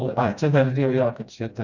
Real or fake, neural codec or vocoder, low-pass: fake; codec, 16 kHz, 1 kbps, FreqCodec, smaller model; 7.2 kHz